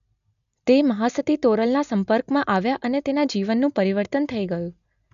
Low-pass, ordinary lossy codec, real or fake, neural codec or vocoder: 7.2 kHz; none; real; none